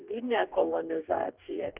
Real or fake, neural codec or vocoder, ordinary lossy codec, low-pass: fake; codec, 44.1 kHz, 2.6 kbps, DAC; Opus, 24 kbps; 3.6 kHz